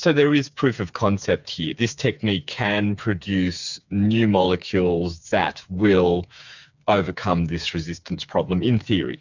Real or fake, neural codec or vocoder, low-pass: fake; codec, 16 kHz, 4 kbps, FreqCodec, smaller model; 7.2 kHz